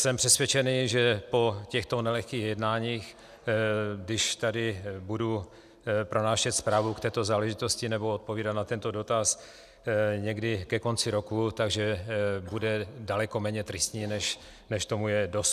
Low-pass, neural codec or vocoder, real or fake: 14.4 kHz; none; real